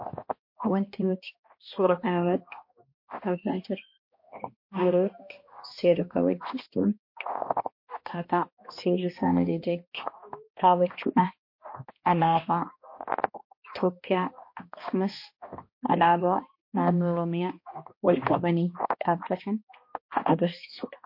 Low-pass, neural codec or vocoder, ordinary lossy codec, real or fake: 5.4 kHz; codec, 16 kHz, 1 kbps, X-Codec, HuBERT features, trained on balanced general audio; MP3, 32 kbps; fake